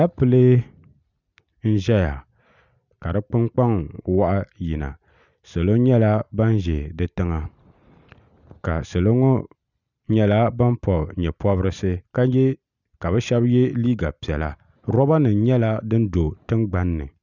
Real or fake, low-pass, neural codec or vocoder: real; 7.2 kHz; none